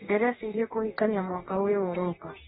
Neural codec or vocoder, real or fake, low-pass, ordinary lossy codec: codec, 44.1 kHz, 2.6 kbps, DAC; fake; 19.8 kHz; AAC, 16 kbps